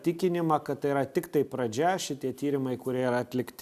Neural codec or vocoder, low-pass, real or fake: none; 14.4 kHz; real